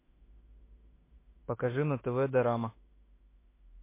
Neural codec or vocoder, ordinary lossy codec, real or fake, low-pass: autoencoder, 48 kHz, 32 numbers a frame, DAC-VAE, trained on Japanese speech; MP3, 24 kbps; fake; 3.6 kHz